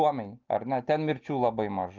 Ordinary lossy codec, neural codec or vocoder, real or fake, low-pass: Opus, 24 kbps; none; real; 7.2 kHz